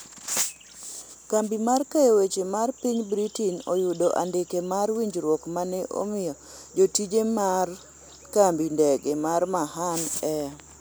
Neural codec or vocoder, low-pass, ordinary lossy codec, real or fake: none; none; none; real